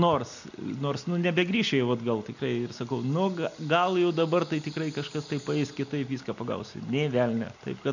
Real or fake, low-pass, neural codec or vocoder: real; 7.2 kHz; none